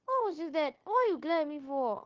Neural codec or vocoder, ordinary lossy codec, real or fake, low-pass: codec, 16 kHz, 0.9 kbps, LongCat-Audio-Codec; Opus, 16 kbps; fake; 7.2 kHz